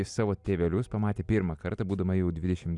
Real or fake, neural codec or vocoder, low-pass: real; none; 10.8 kHz